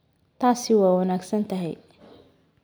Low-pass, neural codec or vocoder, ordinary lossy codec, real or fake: none; none; none; real